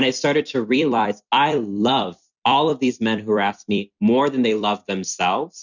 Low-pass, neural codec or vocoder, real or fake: 7.2 kHz; vocoder, 44.1 kHz, 128 mel bands every 256 samples, BigVGAN v2; fake